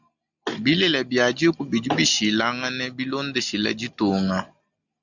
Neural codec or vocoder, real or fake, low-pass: none; real; 7.2 kHz